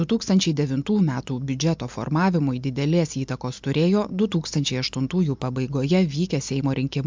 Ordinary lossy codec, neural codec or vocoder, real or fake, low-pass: MP3, 64 kbps; none; real; 7.2 kHz